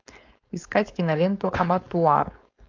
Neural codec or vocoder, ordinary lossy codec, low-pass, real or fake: codec, 16 kHz, 4.8 kbps, FACodec; AAC, 48 kbps; 7.2 kHz; fake